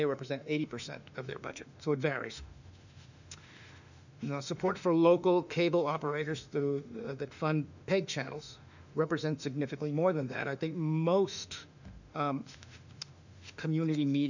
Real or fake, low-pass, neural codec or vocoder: fake; 7.2 kHz; autoencoder, 48 kHz, 32 numbers a frame, DAC-VAE, trained on Japanese speech